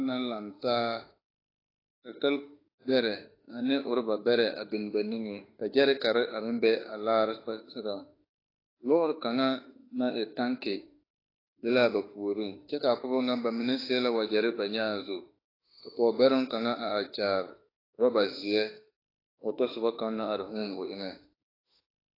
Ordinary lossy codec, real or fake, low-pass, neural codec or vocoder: AAC, 24 kbps; fake; 5.4 kHz; autoencoder, 48 kHz, 32 numbers a frame, DAC-VAE, trained on Japanese speech